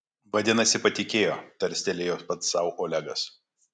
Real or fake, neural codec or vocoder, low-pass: real; none; 9.9 kHz